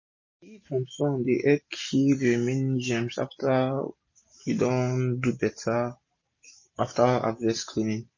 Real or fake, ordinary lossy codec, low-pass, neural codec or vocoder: real; MP3, 32 kbps; 7.2 kHz; none